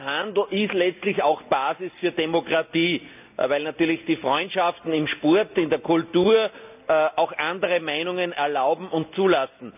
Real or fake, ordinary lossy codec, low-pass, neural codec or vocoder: real; none; 3.6 kHz; none